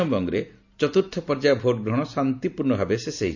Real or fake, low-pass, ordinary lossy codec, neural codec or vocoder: real; 7.2 kHz; none; none